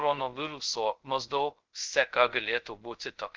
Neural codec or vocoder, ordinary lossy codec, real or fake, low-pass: codec, 16 kHz, 0.2 kbps, FocalCodec; Opus, 16 kbps; fake; 7.2 kHz